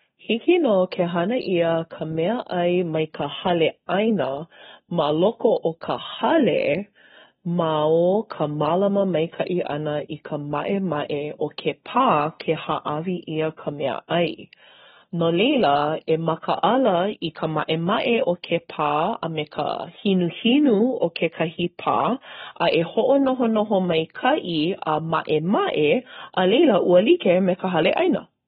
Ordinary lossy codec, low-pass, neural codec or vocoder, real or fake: AAC, 16 kbps; 19.8 kHz; none; real